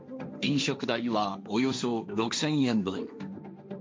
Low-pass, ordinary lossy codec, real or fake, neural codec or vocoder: none; none; fake; codec, 16 kHz, 1.1 kbps, Voila-Tokenizer